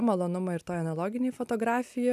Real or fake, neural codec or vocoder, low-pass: real; none; 14.4 kHz